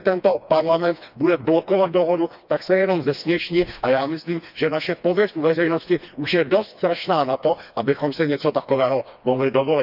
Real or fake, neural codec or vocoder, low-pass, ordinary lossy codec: fake; codec, 16 kHz, 2 kbps, FreqCodec, smaller model; 5.4 kHz; none